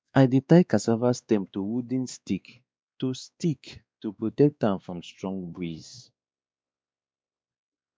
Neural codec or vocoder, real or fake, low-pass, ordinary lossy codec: codec, 16 kHz, 2 kbps, X-Codec, WavLM features, trained on Multilingual LibriSpeech; fake; none; none